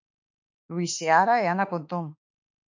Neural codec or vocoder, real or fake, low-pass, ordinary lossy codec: autoencoder, 48 kHz, 32 numbers a frame, DAC-VAE, trained on Japanese speech; fake; 7.2 kHz; MP3, 48 kbps